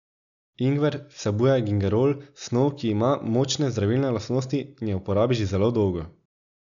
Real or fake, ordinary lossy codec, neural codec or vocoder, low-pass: real; none; none; 7.2 kHz